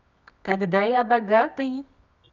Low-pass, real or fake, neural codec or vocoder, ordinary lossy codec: 7.2 kHz; fake; codec, 24 kHz, 0.9 kbps, WavTokenizer, medium music audio release; none